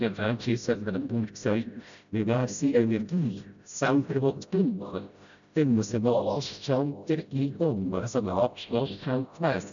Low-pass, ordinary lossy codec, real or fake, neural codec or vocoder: 7.2 kHz; none; fake; codec, 16 kHz, 0.5 kbps, FreqCodec, smaller model